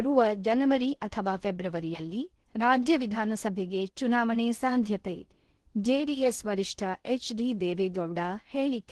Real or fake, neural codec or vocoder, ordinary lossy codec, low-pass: fake; codec, 16 kHz in and 24 kHz out, 0.6 kbps, FocalCodec, streaming, 2048 codes; Opus, 16 kbps; 10.8 kHz